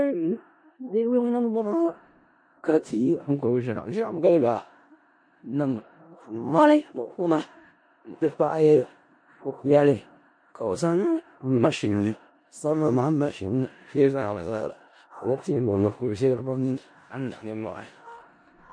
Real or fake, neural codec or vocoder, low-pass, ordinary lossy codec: fake; codec, 16 kHz in and 24 kHz out, 0.4 kbps, LongCat-Audio-Codec, four codebook decoder; 9.9 kHz; MP3, 48 kbps